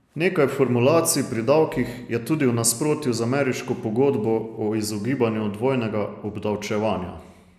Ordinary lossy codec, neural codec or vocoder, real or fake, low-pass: none; none; real; 14.4 kHz